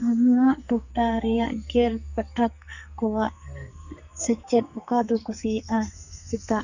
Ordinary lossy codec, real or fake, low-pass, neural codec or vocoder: none; fake; 7.2 kHz; codec, 44.1 kHz, 2.6 kbps, SNAC